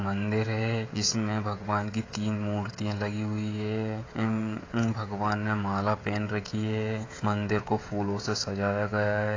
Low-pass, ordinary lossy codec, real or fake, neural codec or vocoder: 7.2 kHz; AAC, 32 kbps; fake; codec, 16 kHz, 16 kbps, FreqCodec, smaller model